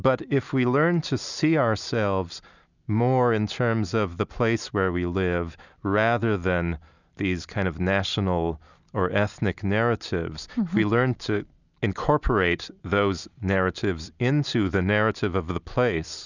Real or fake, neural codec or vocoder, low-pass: real; none; 7.2 kHz